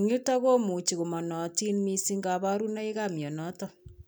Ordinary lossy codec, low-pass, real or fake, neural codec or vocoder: none; none; real; none